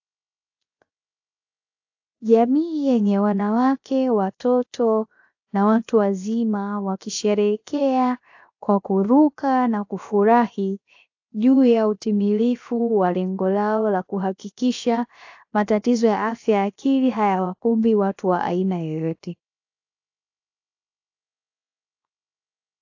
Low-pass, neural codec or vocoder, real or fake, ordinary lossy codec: 7.2 kHz; codec, 16 kHz, 0.7 kbps, FocalCodec; fake; AAC, 48 kbps